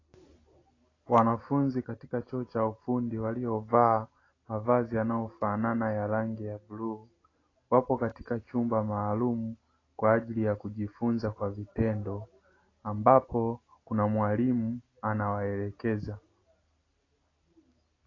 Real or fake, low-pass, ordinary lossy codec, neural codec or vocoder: real; 7.2 kHz; AAC, 32 kbps; none